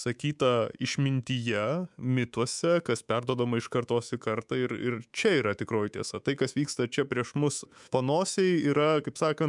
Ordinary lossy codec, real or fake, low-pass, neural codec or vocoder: MP3, 96 kbps; fake; 10.8 kHz; codec, 24 kHz, 3.1 kbps, DualCodec